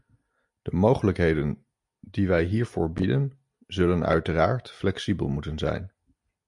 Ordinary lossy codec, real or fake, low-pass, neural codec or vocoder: MP3, 64 kbps; real; 10.8 kHz; none